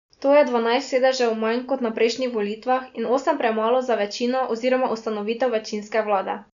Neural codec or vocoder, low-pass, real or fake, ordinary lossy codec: none; 7.2 kHz; real; none